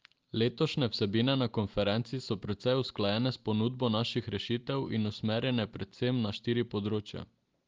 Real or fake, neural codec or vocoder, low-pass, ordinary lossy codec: real; none; 7.2 kHz; Opus, 32 kbps